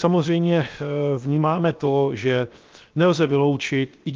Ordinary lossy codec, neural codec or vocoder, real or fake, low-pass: Opus, 24 kbps; codec, 16 kHz, 0.7 kbps, FocalCodec; fake; 7.2 kHz